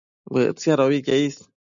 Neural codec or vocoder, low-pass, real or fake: none; 7.2 kHz; real